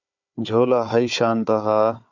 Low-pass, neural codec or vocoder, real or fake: 7.2 kHz; codec, 16 kHz, 4 kbps, FunCodec, trained on Chinese and English, 50 frames a second; fake